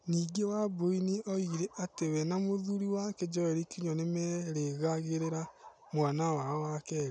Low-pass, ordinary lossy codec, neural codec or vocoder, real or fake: 9.9 kHz; none; none; real